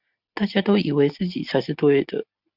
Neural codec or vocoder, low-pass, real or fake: none; 5.4 kHz; real